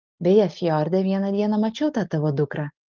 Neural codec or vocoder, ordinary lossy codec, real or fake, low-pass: none; Opus, 32 kbps; real; 7.2 kHz